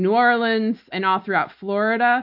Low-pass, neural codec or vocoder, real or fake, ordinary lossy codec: 5.4 kHz; none; real; AAC, 48 kbps